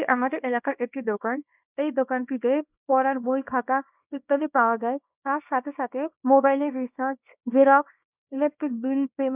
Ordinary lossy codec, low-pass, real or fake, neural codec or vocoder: none; 3.6 kHz; fake; codec, 24 kHz, 0.9 kbps, WavTokenizer, small release